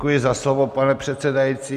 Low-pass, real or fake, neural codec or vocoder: 14.4 kHz; real; none